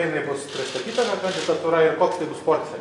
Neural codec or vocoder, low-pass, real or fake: none; 10.8 kHz; real